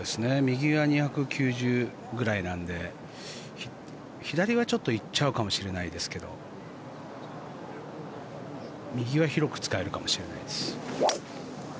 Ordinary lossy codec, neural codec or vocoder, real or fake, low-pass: none; none; real; none